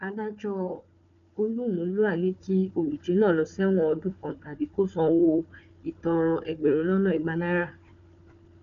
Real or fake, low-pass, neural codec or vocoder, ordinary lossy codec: fake; 7.2 kHz; codec, 16 kHz, 4 kbps, FunCodec, trained on Chinese and English, 50 frames a second; none